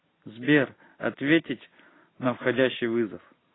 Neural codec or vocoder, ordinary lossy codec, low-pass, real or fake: none; AAC, 16 kbps; 7.2 kHz; real